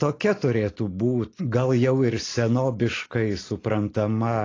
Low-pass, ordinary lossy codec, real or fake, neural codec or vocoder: 7.2 kHz; AAC, 32 kbps; real; none